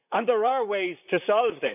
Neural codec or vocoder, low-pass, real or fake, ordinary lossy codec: vocoder, 44.1 kHz, 128 mel bands, Pupu-Vocoder; 3.6 kHz; fake; none